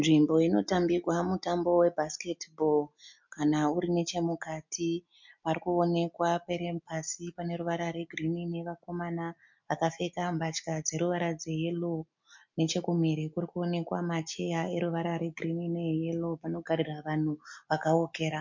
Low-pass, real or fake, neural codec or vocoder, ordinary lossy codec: 7.2 kHz; real; none; MP3, 64 kbps